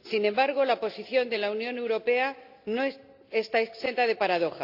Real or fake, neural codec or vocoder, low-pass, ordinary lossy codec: real; none; 5.4 kHz; AAC, 48 kbps